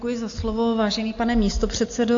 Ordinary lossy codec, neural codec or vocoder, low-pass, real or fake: AAC, 48 kbps; none; 7.2 kHz; real